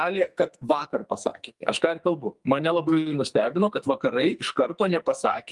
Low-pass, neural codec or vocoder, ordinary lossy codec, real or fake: 10.8 kHz; codec, 44.1 kHz, 2.6 kbps, SNAC; Opus, 32 kbps; fake